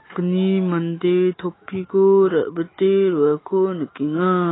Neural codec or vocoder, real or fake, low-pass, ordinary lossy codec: autoencoder, 48 kHz, 128 numbers a frame, DAC-VAE, trained on Japanese speech; fake; 7.2 kHz; AAC, 16 kbps